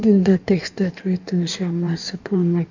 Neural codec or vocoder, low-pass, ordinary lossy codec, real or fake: codec, 16 kHz in and 24 kHz out, 1.1 kbps, FireRedTTS-2 codec; 7.2 kHz; none; fake